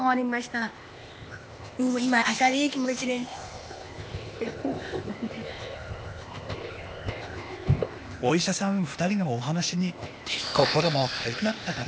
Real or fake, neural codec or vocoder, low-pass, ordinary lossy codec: fake; codec, 16 kHz, 0.8 kbps, ZipCodec; none; none